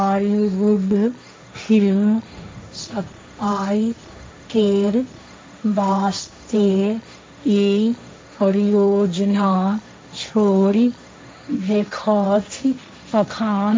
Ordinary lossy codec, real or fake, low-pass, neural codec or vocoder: none; fake; none; codec, 16 kHz, 1.1 kbps, Voila-Tokenizer